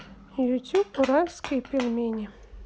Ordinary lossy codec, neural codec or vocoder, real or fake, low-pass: none; none; real; none